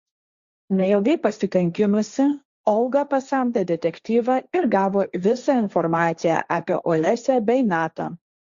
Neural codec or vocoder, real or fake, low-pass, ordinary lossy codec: codec, 16 kHz, 1.1 kbps, Voila-Tokenizer; fake; 7.2 kHz; Opus, 64 kbps